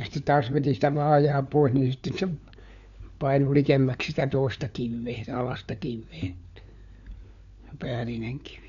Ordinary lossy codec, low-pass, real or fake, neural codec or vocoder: none; 7.2 kHz; fake; codec, 16 kHz, 4 kbps, FunCodec, trained on LibriTTS, 50 frames a second